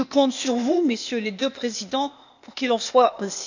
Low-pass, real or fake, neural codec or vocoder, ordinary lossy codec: 7.2 kHz; fake; codec, 16 kHz, 0.8 kbps, ZipCodec; none